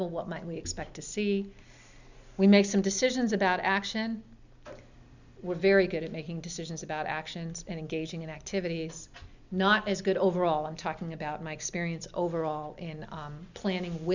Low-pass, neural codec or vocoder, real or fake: 7.2 kHz; none; real